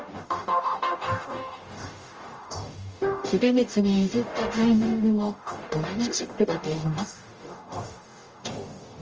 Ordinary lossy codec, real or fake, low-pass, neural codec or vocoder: Opus, 24 kbps; fake; 7.2 kHz; codec, 44.1 kHz, 0.9 kbps, DAC